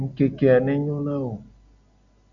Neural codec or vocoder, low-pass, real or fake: none; 7.2 kHz; real